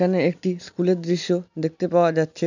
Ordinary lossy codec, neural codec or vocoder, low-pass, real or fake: none; none; 7.2 kHz; real